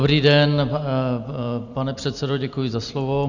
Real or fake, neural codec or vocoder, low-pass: real; none; 7.2 kHz